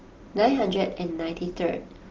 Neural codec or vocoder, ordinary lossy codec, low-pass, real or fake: none; Opus, 16 kbps; 7.2 kHz; real